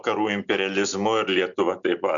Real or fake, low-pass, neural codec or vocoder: real; 7.2 kHz; none